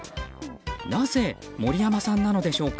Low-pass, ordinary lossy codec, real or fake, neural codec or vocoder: none; none; real; none